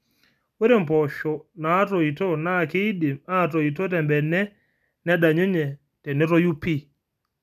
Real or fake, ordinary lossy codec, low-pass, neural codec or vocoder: real; none; 14.4 kHz; none